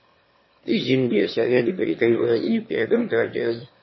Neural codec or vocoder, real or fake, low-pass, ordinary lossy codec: autoencoder, 22.05 kHz, a latent of 192 numbers a frame, VITS, trained on one speaker; fake; 7.2 kHz; MP3, 24 kbps